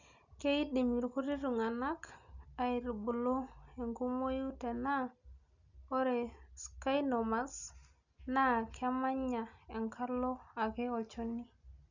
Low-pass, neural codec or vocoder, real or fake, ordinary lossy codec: 7.2 kHz; none; real; none